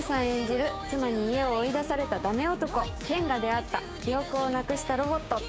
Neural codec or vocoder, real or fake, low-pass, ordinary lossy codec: codec, 16 kHz, 6 kbps, DAC; fake; none; none